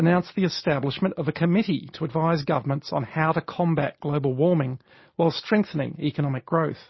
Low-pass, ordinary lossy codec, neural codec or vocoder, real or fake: 7.2 kHz; MP3, 24 kbps; none; real